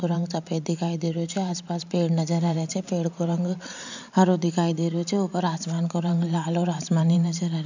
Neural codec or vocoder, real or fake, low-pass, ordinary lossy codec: vocoder, 44.1 kHz, 128 mel bands every 512 samples, BigVGAN v2; fake; 7.2 kHz; none